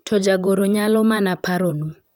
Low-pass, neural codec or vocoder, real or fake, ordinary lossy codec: none; vocoder, 44.1 kHz, 128 mel bands, Pupu-Vocoder; fake; none